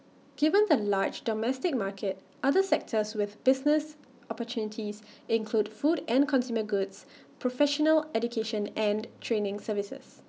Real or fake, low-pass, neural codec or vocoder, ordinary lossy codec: real; none; none; none